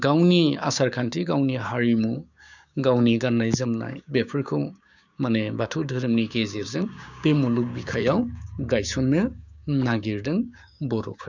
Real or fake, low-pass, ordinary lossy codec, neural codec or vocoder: fake; 7.2 kHz; none; codec, 16 kHz, 6 kbps, DAC